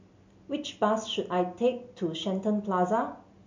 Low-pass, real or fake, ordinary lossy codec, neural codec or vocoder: 7.2 kHz; real; MP3, 64 kbps; none